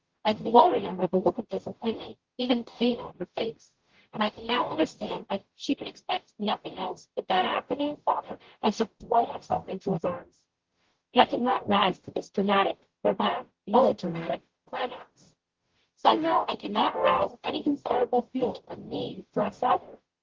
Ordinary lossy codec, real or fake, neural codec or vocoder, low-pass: Opus, 16 kbps; fake; codec, 44.1 kHz, 0.9 kbps, DAC; 7.2 kHz